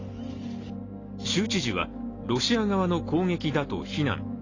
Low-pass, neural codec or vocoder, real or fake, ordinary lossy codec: 7.2 kHz; none; real; AAC, 32 kbps